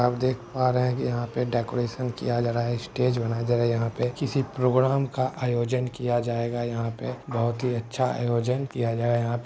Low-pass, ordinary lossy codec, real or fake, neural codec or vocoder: none; none; real; none